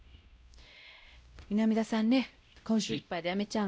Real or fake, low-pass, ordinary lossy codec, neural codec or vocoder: fake; none; none; codec, 16 kHz, 0.5 kbps, X-Codec, WavLM features, trained on Multilingual LibriSpeech